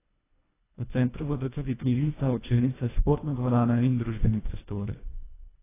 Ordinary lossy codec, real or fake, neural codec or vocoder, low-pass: AAC, 16 kbps; fake; codec, 24 kHz, 1.5 kbps, HILCodec; 3.6 kHz